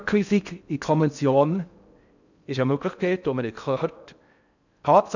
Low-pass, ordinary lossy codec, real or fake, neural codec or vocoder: 7.2 kHz; none; fake; codec, 16 kHz in and 24 kHz out, 0.6 kbps, FocalCodec, streaming, 4096 codes